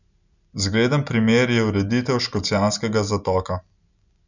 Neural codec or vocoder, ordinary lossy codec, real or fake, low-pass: none; none; real; 7.2 kHz